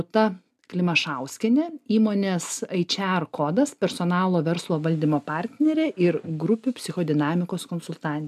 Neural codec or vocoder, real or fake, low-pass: none; real; 14.4 kHz